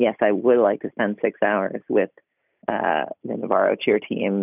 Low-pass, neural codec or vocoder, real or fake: 3.6 kHz; none; real